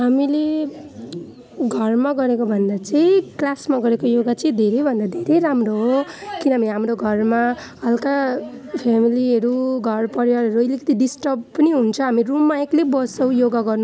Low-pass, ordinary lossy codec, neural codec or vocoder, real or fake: none; none; none; real